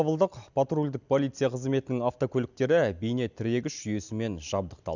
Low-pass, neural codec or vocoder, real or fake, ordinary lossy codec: 7.2 kHz; none; real; none